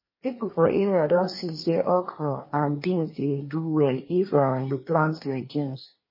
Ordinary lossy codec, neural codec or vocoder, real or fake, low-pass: MP3, 24 kbps; codec, 24 kHz, 1 kbps, SNAC; fake; 5.4 kHz